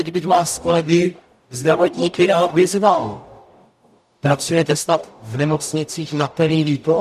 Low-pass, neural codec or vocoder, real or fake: 14.4 kHz; codec, 44.1 kHz, 0.9 kbps, DAC; fake